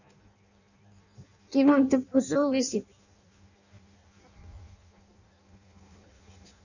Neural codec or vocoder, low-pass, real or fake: codec, 16 kHz in and 24 kHz out, 0.6 kbps, FireRedTTS-2 codec; 7.2 kHz; fake